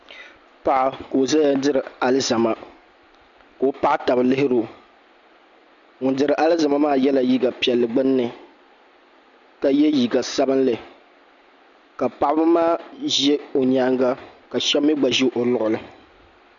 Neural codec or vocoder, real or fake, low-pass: none; real; 7.2 kHz